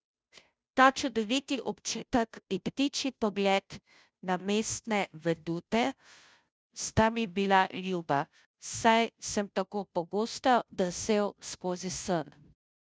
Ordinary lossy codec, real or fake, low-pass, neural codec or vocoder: none; fake; none; codec, 16 kHz, 0.5 kbps, FunCodec, trained on Chinese and English, 25 frames a second